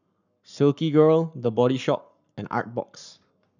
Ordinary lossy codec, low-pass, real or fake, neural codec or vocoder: none; 7.2 kHz; fake; codec, 44.1 kHz, 7.8 kbps, Pupu-Codec